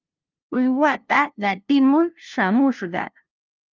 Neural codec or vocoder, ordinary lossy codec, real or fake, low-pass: codec, 16 kHz, 0.5 kbps, FunCodec, trained on LibriTTS, 25 frames a second; Opus, 24 kbps; fake; 7.2 kHz